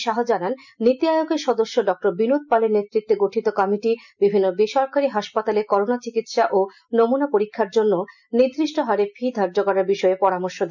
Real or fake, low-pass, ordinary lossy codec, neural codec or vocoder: real; 7.2 kHz; none; none